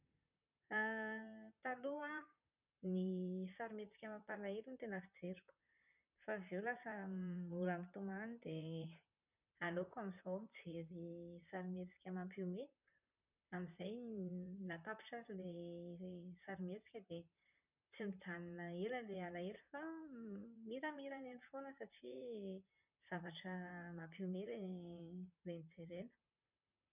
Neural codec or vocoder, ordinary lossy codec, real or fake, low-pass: vocoder, 44.1 kHz, 128 mel bands, Pupu-Vocoder; none; fake; 3.6 kHz